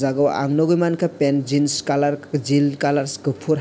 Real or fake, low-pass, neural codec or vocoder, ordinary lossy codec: real; none; none; none